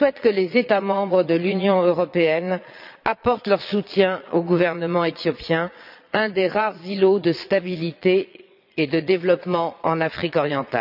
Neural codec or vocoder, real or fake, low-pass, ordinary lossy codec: vocoder, 22.05 kHz, 80 mel bands, Vocos; fake; 5.4 kHz; none